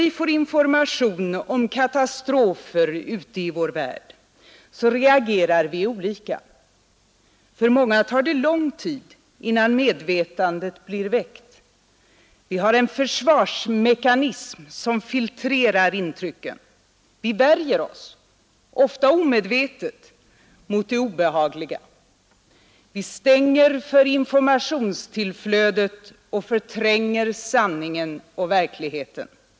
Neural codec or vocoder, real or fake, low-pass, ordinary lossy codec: none; real; none; none